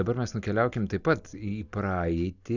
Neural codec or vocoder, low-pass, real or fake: none; 7.2 kHz; real